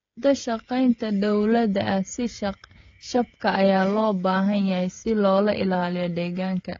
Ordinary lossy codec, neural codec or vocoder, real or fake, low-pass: AAC, 32 kbps; codec, 16 kHz, 16 kbps, FreqCodec, smaller model; fake; 7.2 kHz